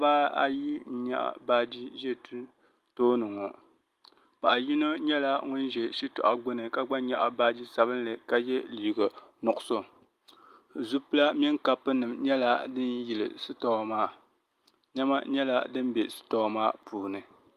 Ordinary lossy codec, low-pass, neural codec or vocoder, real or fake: Opus, 32 kbps; 14.4 kHz; none; real